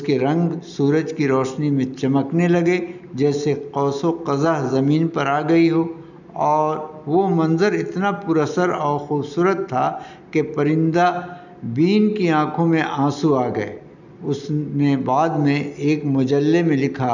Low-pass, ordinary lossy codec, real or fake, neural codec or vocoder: 7.2 kHz; none; real; none